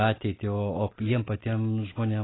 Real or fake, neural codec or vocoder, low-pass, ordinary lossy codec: real; none; 7.2 kHz; AAC, 16 kbps